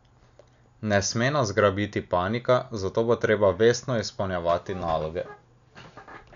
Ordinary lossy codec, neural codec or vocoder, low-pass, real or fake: none; none; 7.2 kHz; real